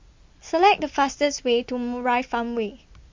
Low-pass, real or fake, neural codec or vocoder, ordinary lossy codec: 7.2 kHz; real; none; MP3, 48 kbps